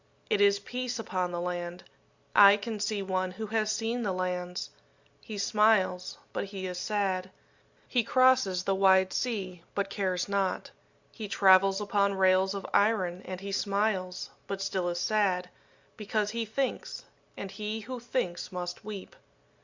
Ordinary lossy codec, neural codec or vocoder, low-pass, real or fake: Opus, 64 kbps; none; 7.2 kHz; real